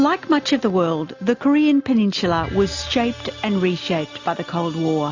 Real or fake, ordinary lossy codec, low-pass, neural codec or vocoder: real; AAC, 48 kbps; 7.2 kHz; none